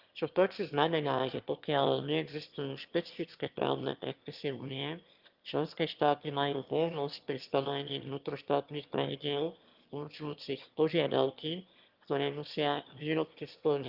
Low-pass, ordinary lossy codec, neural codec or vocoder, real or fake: 5.4 kHz; Opus, 32 kbps; autoencoder, 22.05 kHz, a latent of 192 numbers a frame, VITS, trained on one speaker; fake